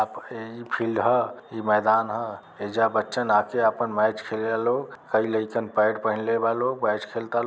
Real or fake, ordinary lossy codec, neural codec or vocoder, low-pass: real; none; none; none